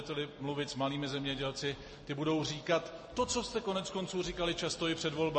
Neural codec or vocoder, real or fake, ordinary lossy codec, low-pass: none; real; MP3, 32 kbps; 10.8 kHz